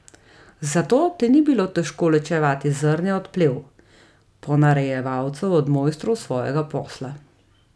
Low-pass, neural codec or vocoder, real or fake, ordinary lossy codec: none; none; real; none